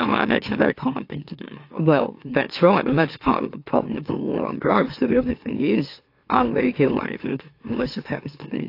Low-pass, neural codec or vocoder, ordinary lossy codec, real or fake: 5.4 kHz; autoencoder, 44.1 kHz, a latent of 192 numbers a frame, MeloTTS; AAC, 32 kbps; fake